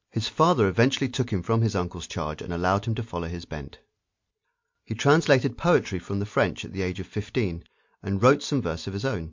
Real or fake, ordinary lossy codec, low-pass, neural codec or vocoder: real; MP3, 48 kbps; 7.2 kHz; none